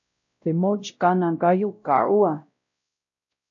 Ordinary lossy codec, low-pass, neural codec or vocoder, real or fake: AAC, 64 kbps; 7.2 kHz; codec, 16 kHz, 0.5 kbps, X-Codec, WavLM features, trained on Multilingual LibriSpeech; fake